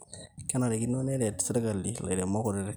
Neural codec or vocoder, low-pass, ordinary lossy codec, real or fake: none; none; none; real